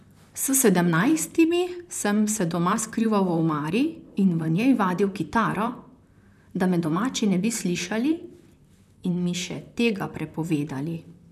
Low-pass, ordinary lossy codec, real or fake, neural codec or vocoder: 14.4 kHz; none; fake; vocoder, 44.1 kHz, 128 mel bands, Pupu-Vocoder